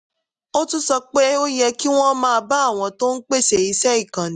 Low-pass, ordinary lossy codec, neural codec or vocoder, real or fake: 10.8 kHz; none; none; real